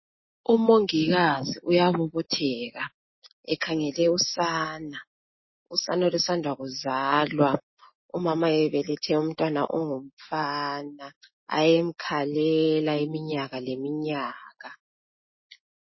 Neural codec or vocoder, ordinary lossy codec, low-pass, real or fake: none; MP3, 24 kbps; 7.2 kHz; real